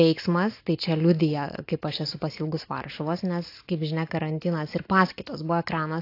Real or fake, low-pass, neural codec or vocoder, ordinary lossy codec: real; 5.4 kHz; none; AAC, 32 kbps